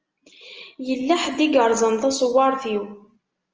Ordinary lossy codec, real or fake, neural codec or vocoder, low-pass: Opus, 24 kbps; real; none; 7.2 kHz